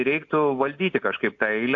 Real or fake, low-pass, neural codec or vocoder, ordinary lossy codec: real; 7.2 kHz; none; AAC, 48 kbps